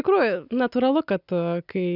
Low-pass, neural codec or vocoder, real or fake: 5.4 kHz; none; real